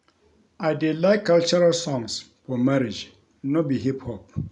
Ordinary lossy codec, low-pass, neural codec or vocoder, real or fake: none; 10.8 kHz; none; real